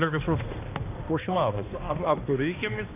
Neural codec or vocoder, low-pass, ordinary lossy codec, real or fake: codec, 16 kHz, 1 kbps, X-Codec, HuBERT features, trained on balanced general audio; 3.6 kHz; AAC, 24 kbps; fake